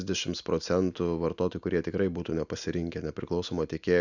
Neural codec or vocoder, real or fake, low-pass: none; real; 7.2 kHz